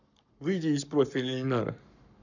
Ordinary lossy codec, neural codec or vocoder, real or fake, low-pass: none; codec, 44.1 kHz, 7.8 kbps, Pupu-Codec; fake; 7.2 kHz